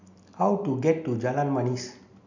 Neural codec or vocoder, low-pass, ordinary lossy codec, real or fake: none; 7.2 kHz; none; real